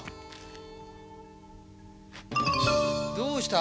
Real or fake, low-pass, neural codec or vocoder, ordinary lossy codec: real; none; none; none